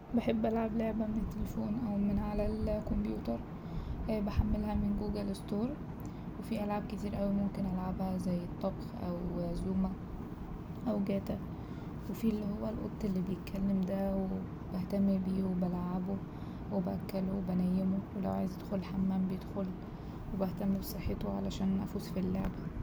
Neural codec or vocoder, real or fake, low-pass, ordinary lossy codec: none; real; none; none